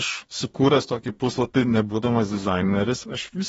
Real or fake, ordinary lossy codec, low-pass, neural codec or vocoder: fake; AAC, 24 kbps; 19.8 kHz; codec, 44.1 kHz, 2.6 kbps, DAC